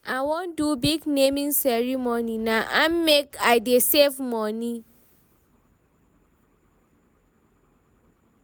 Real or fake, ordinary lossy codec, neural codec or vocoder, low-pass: real; none; none; none